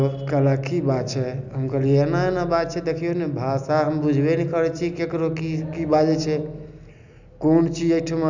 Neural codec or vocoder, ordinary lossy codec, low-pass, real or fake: none; none; 7.2 kHz; real